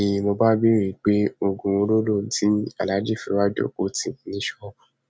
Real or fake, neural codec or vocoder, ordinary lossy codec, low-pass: real; none; none; none